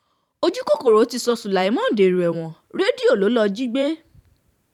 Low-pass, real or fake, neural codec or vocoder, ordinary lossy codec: 19.8 kHz; fake; vocoder, 44.1 kHz, 128 mel bands every 512 samples, BigVGAN v2; none